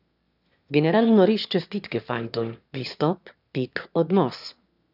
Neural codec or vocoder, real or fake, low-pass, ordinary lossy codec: autoencoder, 22.05 kHz, a latent of 192 numbers a frame, VITS, trained on one speaker; fake; 5.4 kHz; none